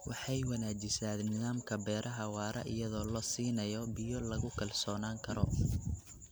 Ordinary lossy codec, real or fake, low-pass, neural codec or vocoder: none; real; none; none